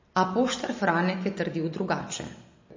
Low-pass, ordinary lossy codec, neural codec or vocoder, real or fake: 7.2 kHz; MP3, 32 kbps; none; real